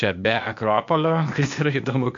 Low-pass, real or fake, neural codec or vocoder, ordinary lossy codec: 7.2 kHz; fake; codec, 16 kHz, 0.8 kbps, ZipCodec; AAC, 64 kbps